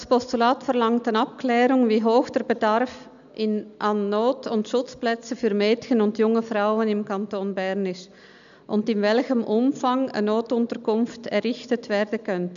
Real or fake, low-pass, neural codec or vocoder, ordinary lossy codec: real; 7.2 kHz; none; MP3, 96 kbps